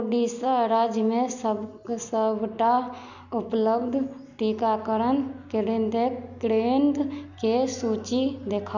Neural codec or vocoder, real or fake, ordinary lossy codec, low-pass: none; real; none; 7.2 kHz